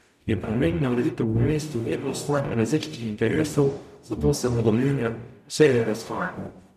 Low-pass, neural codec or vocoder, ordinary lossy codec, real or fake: 14.4 kHz; codec, 44.1 kHz, 0.9 kbps, DAC; none; fake